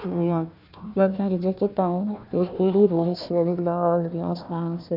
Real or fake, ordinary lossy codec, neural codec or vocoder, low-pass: fake; none; codec, 16 kHz, 1 kbps, FunCodec, trained on Chinese and English, 50 frames a second; 5.4 kHz